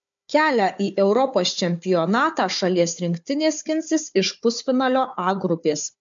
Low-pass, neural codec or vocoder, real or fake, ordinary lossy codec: 7.2 kHz; codec, 16 kHz, 4 kbps, FunCodec, trained on Chinese and English, 50 frames a second; fake; MP3, 64 kbps